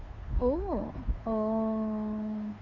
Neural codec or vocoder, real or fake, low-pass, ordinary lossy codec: codec, 16 kHz, 2 kbps, FunCodec, trained on Chinese and English, 25 frames a second; fake; 7.2 kHz; Opus, 64 kbps